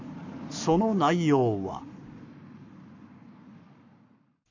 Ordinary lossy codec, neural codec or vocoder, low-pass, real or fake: none; codec, 44.1 kHz, 7.8 kbps, Pupu-Codec; 7.2 kHz; fake